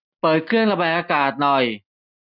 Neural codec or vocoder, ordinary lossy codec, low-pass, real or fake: none; none; 5.4 kHz; real